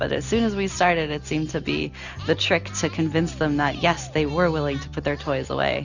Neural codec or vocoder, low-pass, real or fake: none; 7.2 kHz; real